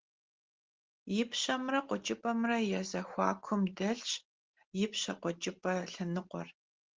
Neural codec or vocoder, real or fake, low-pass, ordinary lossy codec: none; real; 7.2 kHz; Opus, 16 kbps